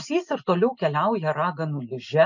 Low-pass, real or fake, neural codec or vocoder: 7.2 kHz; real; none